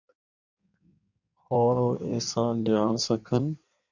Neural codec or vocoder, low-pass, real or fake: codec, 16 kHz in and 24 kHz out, 1.1 kbps, FireRedTTS-2 codec; 7.2 kHz; fake